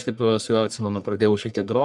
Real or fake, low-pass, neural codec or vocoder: fake; 10.8 kHz; codec, 44.1 kHz, 1.7 kbps, Pupu-Codec